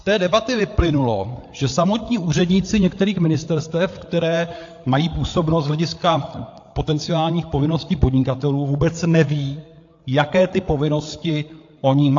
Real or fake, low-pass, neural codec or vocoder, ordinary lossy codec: fake; 7.2 kHz; codec, 16 kHz, 8 kbps, FreqCodec, larger model; AAC, 48 kbps